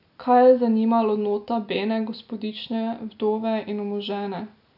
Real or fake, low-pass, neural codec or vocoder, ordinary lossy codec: real; 5.4 kHz; none; none